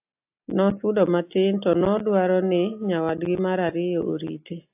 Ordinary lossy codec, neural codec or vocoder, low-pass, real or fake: AAC, 32 kbps; none; 3.6 kHz; real